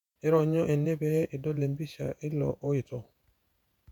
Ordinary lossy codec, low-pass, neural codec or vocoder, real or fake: none; 19.8 kHz; vocoder, 48 kHz, 128 mel bands, Vocos; fake